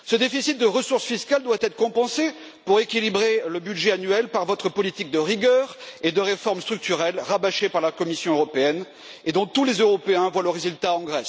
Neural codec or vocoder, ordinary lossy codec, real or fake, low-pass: none; none; real; none